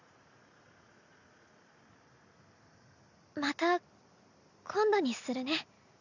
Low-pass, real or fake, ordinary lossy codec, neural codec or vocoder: 7.2 kHz; real; none; none